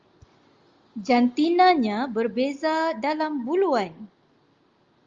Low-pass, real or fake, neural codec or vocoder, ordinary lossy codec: 7.2 kHz; real; none; Opus, 32 kbps